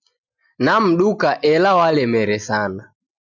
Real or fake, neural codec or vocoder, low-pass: real; none; 7.2 kHz